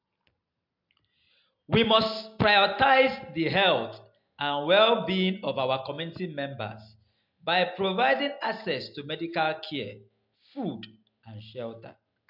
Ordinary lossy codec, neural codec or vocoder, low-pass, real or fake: MP3, 48 kbps; none; 5.4 kHz; real